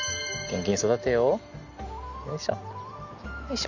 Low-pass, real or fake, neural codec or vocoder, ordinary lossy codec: 7.2 kHz; real; none; none